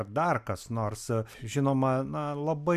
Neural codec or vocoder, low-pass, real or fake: none; 14.4 kHz; real